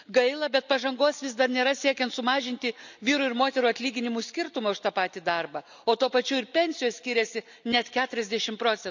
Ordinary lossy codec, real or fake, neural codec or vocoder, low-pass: none; real; none; 7.2 kHz